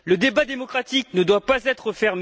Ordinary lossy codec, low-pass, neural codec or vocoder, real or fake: none; none; none; real